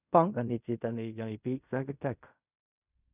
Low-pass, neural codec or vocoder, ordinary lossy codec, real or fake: 3.6 kHz; codec, 16 kHz in and 24 kHz out, 0.4 kbps, LongCat-Audio-Codec, fine tuned four codebook decoder; none; fake